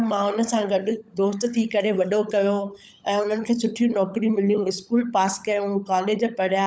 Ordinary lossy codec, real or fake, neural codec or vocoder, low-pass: none; fake; codec, 16 kHz, 16 kbps, FunCodec, trained on LibriTTS, 50 frames a second; none